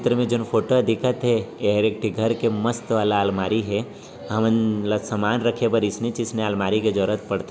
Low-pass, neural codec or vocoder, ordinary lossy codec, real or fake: none; none; none; real